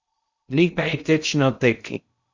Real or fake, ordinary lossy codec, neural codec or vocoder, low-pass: fake; Opus, 64 kbps; codec, 16 kHz in and 24 kHz out, 0.6 kbps, FocalCodec, streaming, 2048 codes; 7.2 kHz